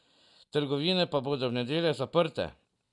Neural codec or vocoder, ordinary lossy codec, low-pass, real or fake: none; none; 10.8 kHz; real